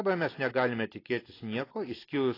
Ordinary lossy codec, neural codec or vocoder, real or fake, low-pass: AAC, 24 kbps; none; real; 5.4 kHz